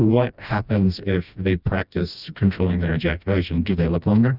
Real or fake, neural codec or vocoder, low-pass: fake; codec, 16 kHz, 1 kbps, FreqCodec, smaller model; 5.4 kHz